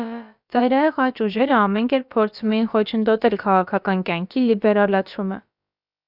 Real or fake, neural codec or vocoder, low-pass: fake; codec, 16 kHz, about 1 kbps, DyCAST, with the encoder's durations; 5.4 kHz